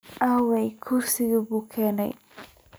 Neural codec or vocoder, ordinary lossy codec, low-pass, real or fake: none; none; none; real